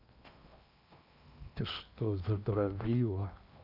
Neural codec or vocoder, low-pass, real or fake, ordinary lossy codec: codec, 16 kHz in and 24 kHz out, 0.8 kbps, FocalCodec, streaming, 65536 codes; 5.4 kHz; fake; none